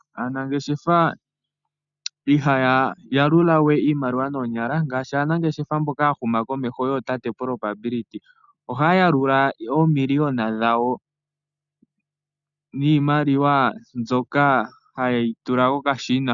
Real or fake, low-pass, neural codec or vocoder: real; 7.2 kHz; none